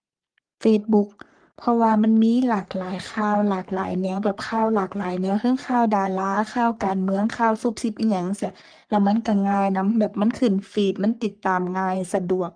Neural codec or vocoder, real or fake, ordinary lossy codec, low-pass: codec, 44.1 kHz, 3.4 kbps, Pupu-Codec; fake; Opus, 32 kbps; 9.9 kHz